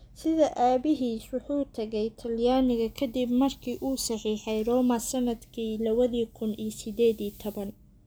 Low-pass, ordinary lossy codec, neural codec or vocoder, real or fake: none; none; none; real